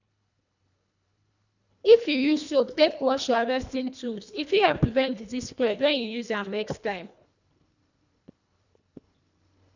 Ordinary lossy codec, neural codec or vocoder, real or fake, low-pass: none; codec, 24 kHz, 1.5 kbps, HILCodec; fake; 7.2 kHz